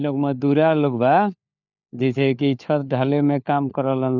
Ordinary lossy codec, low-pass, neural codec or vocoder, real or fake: none; 7.2 kHz; codec, 16 kHz, 4 kbps, FunCodec, trained on LibriTTS, 50 frames a second; fake